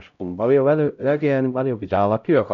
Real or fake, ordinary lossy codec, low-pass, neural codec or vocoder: fake; none; 7.2 kHz; codec, 16 kHz, 0.5 kbps, X-Codec, HuBERT features, trained on LibriSpeech